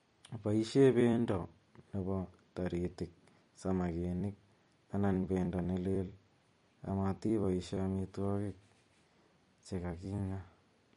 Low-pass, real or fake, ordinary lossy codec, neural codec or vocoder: 19.8 kHz; fake; MP3, 48 kbps; vocoder, 44.1 kHz, 128 mel bands every 256 samples, BigVGAN v2